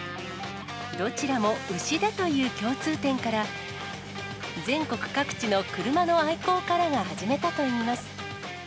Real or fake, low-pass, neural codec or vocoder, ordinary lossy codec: real; none; none; none